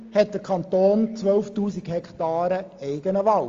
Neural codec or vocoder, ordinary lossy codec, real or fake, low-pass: none; Opus, 32 kbps; real; 7.2 kHz